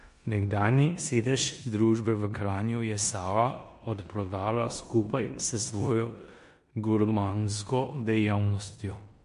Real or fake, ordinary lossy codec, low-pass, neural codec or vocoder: fake; MP3, 48 kbps; 10.8 kHz; codec, 16 kHz in and 24 kHz out, 0.9 kbps, LongCat-Audio-Codec, four codebook decoder